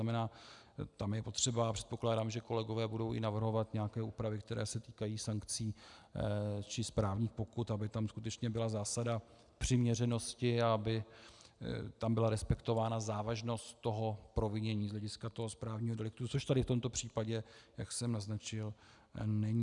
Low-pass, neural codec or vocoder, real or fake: 10.8 kHz; none; real